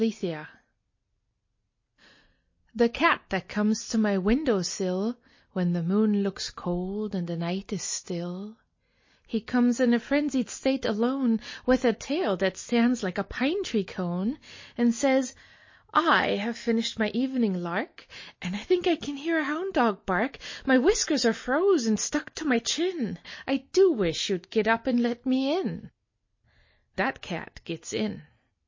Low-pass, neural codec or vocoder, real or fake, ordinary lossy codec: 7.2 kHz; none; real; MP3, 32 kbps